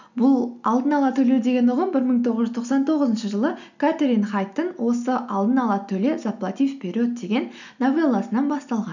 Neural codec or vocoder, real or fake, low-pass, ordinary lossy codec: none; real; 7.2 kHz; none